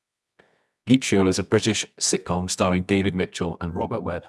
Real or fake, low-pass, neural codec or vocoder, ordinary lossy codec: fake; none; codec, 24 kHz, 0.9 kbps, WavTokenizer, medium music audio release; none